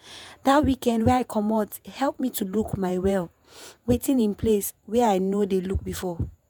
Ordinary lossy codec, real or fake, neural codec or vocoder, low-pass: none; fake; vocoder, 48 kHz, 128 mel bands, Vocos; none